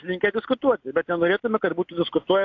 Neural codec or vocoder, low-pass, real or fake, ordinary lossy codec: none; 7.2 kHz; real; AAC, 48 kbps